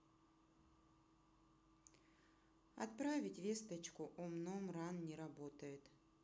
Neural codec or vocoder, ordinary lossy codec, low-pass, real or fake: none; none; none; real